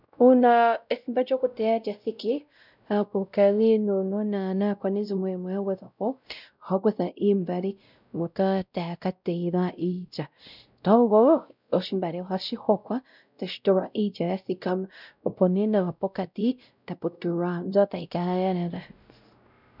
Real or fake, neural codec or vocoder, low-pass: fake; codec, 16 kHz, 0.5 kbps, X-Codec, WavLM features, trained on Multilingual LibriSpeech; 5.4 kHz